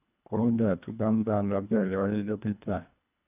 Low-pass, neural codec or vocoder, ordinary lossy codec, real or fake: 3.6 kHz; codec, 24 kHz, 1.5 kbps, HILCodec; none; fake